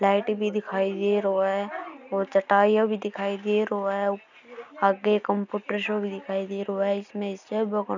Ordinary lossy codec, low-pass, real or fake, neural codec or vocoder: none; 7.2 kHz; real; none